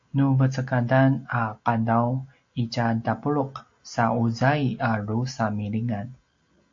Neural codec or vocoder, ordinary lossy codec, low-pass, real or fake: none; AAC, 48 kbps; 7.2 kHz; real